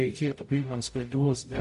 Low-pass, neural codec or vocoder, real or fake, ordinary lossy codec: 14.4 kHz; codec, 44.1 kHz, 0.9 kbps, DAC; fake; MP3, 48 kbps